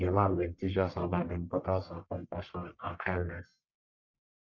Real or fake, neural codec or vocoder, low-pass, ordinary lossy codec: fake; codec, 44.1 kHz, 1.7 kbps, Pupu-Codec; 7.2 kHz; none